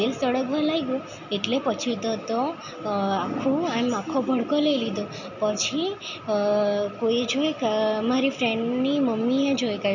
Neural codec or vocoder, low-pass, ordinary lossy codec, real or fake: none; 7.2 kHz; none; real